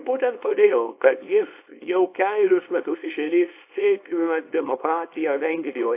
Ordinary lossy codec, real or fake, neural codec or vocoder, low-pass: AAC, 24 kbps; fake; codec, 24 kHz, 0.9 kbps, WavTokenizer, small release; 3.6 kHz